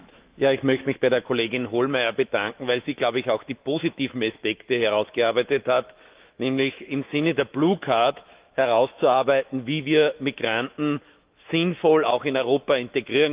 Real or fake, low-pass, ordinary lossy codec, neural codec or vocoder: fake; 3.6 kHz; Opus, 32 kbps; autoencoder, 48 kHz, 128 numbers a frame, DAC-VAE, trained on Japanese speech